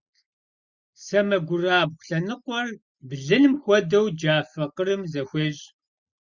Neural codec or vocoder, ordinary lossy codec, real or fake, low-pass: none; Opus, 64 kbps; real; 7.2 kHz